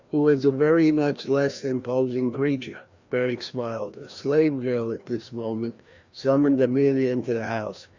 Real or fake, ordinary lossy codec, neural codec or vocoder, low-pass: fake; Opus, 64 kbps; codec, 16 kHz, 1 kbps, FreqCodec, larger model; 7.2 kHz